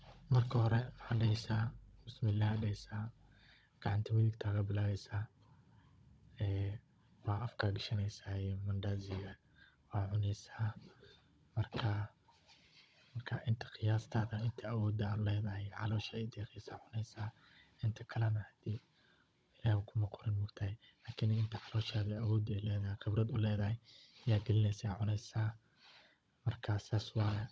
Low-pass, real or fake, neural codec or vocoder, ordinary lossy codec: none; fake; codec, 16 kHz, 16 kbps, FunCodec, trained on Chinese and English, 50 frames a second; none